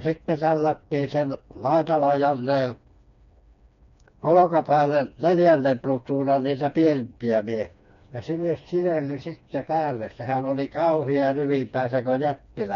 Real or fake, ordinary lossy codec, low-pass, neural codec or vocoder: fake; none; 7.2 kHz; codec, 16 kHz, 2 kbps, FreqCodec, smaller model